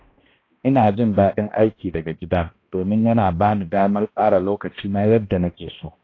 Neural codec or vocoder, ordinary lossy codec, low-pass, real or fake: codec, 16 kHz, 1 kbps, X-Codec, HuBERT features, trained on balanced general audio; AAC, 32 kbps; 7.2 kHz; fake